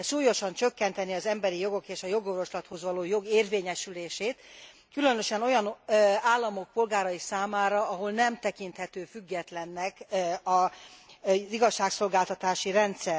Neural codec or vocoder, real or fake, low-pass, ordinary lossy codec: none; real; none; none